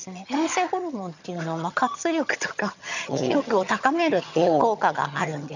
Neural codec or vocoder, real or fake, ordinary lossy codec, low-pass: vocoder, 22.05 kHz, 80 mel bands, HiFi-GAN; fake; none; 7.2 kHz